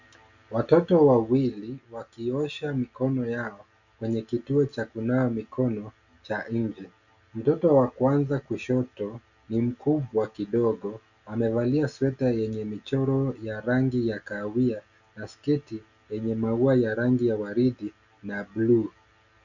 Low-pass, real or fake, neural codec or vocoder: 7.2 kHz; real; none